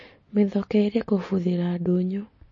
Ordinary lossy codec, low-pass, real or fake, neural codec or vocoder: MP3, 32 kbps; 7.2 kHz; real; none